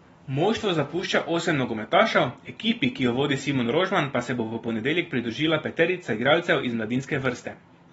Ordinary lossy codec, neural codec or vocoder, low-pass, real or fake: AAC, 24 kbps; none; 19.8 kHz; real